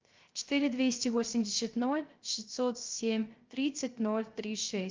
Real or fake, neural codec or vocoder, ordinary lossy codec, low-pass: fake; codec, 16 kHz, 0.3 kbps, FocalCodec; Opus, 24 kbps; 7.2 kHz